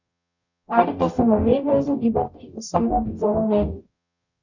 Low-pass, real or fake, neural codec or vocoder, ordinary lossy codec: 7.2 kHz; fake; codec, 44.1 kHz, 0.9 kbps, DAC; none